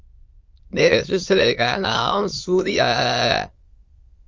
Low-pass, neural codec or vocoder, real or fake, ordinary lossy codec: 7.2 kHz; autoencoder, 22.05 kHz, a latent of 192 numbers a frame, VITS, trained on many speakers; fake; Opus, 32 kbps